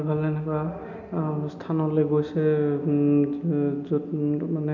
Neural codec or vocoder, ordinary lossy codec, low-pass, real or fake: none; none; 7.2 kHz; real